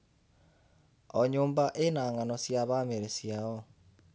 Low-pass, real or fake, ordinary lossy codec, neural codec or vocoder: none; real; none; none